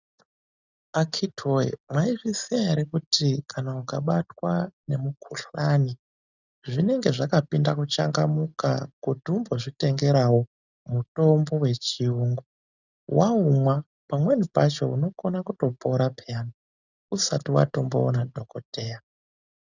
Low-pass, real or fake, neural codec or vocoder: 7.2 kHz; real; none